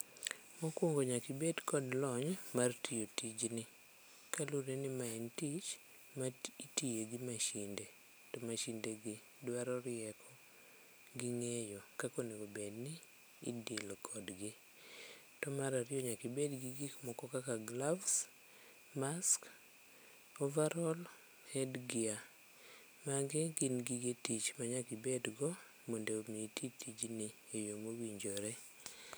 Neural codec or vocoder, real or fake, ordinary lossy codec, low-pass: none; real; none; none